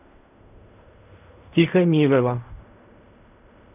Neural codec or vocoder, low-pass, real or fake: codec, 16 kHz in and 24 kHz out, 0.4 kbps, LongCat-Audio-Codec, fine tuned four codebook decoder; 3.6 kHz; fake